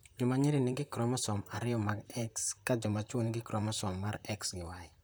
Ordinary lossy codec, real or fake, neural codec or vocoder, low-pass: none; fake; vocoder, 44.1 kHz, 128 mel bands, Pupu-Vocoder; none